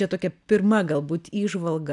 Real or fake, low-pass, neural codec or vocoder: real; 10.8 kHz; none